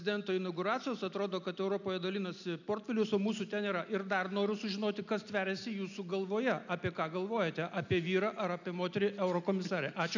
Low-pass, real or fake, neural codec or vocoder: 7.2 kHz; real; none